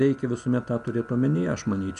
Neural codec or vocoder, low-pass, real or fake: none; 10.8 kHz; real